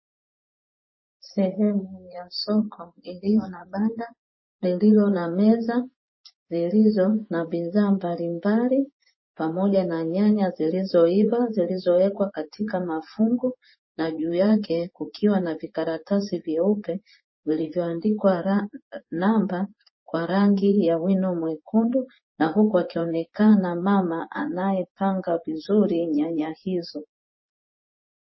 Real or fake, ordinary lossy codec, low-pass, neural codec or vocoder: fake; MP3, 24 kbps; 7.2 kHz; vocoder, 24 kHz, 100 mel bands, Vocos